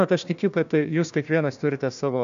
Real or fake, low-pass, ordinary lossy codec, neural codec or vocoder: fake; 7.2 kHz; AAC, 96 kbps; codec, 16 kHz, 1 kbps, FunCodec, trained on Chinese and English, 50 frames a second